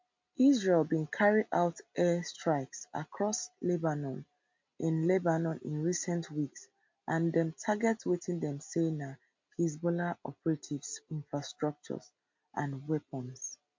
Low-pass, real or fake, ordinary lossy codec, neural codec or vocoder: 7.2 kHz; real; MP3, 48 kbps; none